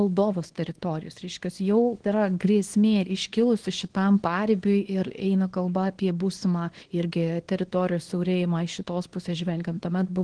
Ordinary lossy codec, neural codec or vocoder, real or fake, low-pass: Opus, 16 kbps; codec, 24 kHz, 0.9 kbps, WavTokenizer, small release; fake; 9.9 kHz